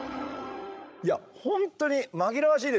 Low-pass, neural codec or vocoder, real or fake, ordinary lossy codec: none; codec, 16 kHz, 16 kbps, FreqCodec, larger model; fake; none